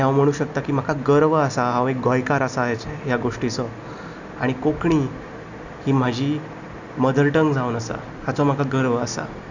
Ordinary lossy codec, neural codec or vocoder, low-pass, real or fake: none; none; 7.2 kHz; real